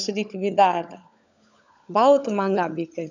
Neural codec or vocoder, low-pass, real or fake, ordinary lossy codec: codec, 16 kHz, 16 kbps, FunCodec, trained on LibriTTS, 50 frames a second; 7.2 kHz; fake; none